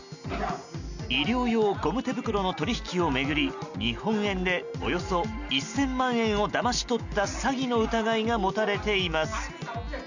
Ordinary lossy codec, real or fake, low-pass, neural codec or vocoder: none; real; 7.2 kHz; none